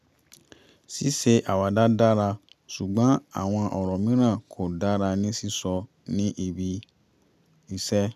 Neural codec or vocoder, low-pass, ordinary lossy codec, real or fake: none; 14.4 kHz; none; real